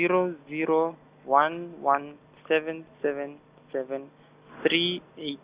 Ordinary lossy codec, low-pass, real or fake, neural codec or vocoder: Opus, 64 kbps; 3.6 kHz; real; none